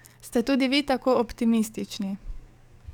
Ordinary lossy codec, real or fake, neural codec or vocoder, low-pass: none; fake; codec, 44.1 kHz, 7.8 kbps, DAC; 19.8 kHz